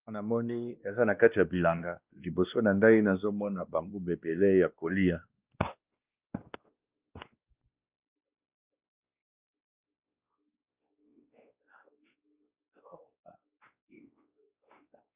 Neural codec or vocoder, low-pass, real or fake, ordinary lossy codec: codec, 16 kHz, 1 kbps, X-Codec, WavLM features, trained on Multilingual LibriSpeech; 3.6 kHz; fake; Opus, 32 kbps